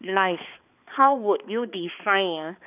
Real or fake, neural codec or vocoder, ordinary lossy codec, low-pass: fake; codec, 16 kHz, 2 kbps, X-Codec, HuBERT features, trained on balanced general audio; none; 3.6 kHz